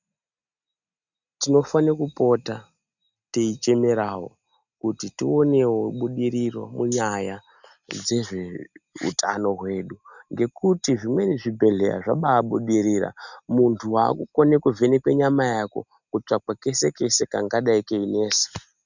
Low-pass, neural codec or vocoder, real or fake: 7.2 kHz; none; real